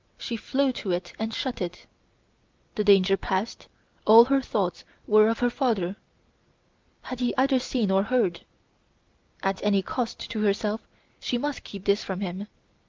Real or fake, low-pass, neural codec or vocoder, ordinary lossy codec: real; 7.2 kHz; none; Opus, 24 kbps